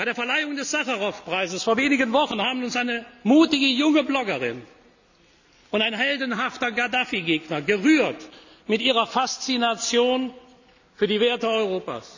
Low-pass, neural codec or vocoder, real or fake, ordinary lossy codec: 7.2 kHz; none; real; none